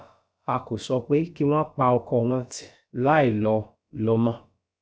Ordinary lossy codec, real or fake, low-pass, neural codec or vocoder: none; fake; none; codec, 16 kHz, about 1 kbps, DyCAST, with the encoder's durations